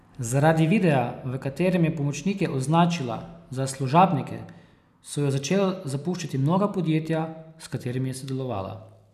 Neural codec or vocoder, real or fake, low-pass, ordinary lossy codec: none; real; 14.4 kHz; none